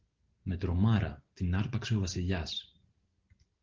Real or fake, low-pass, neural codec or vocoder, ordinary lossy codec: real; 7.2 kHz; none; Opus, 16 kbps